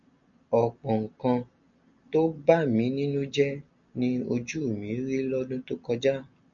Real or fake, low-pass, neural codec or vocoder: real; 7.2 kHz; none